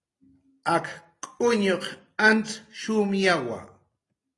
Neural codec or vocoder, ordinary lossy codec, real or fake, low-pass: none; AAC, 32 kbps; real; 10.8 kHz